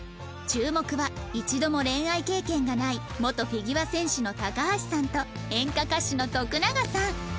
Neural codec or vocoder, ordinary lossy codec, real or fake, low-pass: none; none; real; none